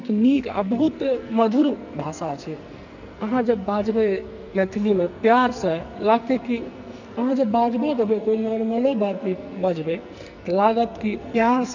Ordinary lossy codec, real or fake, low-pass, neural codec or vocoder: none; fake; 7.2 kHz; codec, 44.1 kHz, 2.6 kbps, SNAC